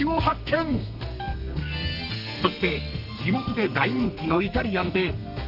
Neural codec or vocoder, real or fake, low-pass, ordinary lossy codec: codec, 32 kHz, 1.9 kbps, SNAC; fake; 5.4 kHz; none